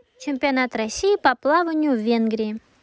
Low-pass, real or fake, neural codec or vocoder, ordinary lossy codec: none; real; none; none